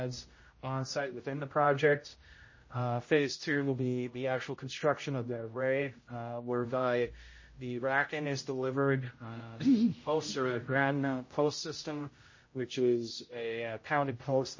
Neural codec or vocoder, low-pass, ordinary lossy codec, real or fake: codec, 16 kHz, 0.5 kbps, X-Codec, HuBERT features, trained on general audio; 7.2 kHz; MP3, 32 kbps; fake